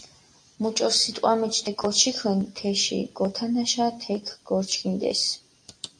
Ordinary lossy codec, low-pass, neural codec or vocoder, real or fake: AAC, 64 kbps; 9.9 kHz; none; real